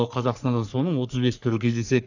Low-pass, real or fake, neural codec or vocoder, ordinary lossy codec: 7.2 kHz; fake; codec, 44.1 kHz, 3.4 kbps, Pupu-Codec; none